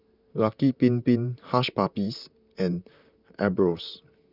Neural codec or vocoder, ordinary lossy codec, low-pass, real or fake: vocoder, 44.1 kHz, 128 mel bands, Pupu-Vocoder; none; 5.4 kHz; fake